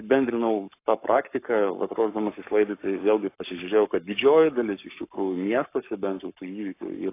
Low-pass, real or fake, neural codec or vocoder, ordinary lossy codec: 3.6 kHz; fake; codec, 44.1 kHz, 7.8 kbps, Pupu-Codec; AAC, 24 kbps